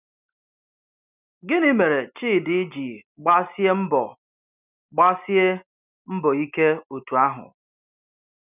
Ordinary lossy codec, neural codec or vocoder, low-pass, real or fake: none; none; 3.6 kHz; real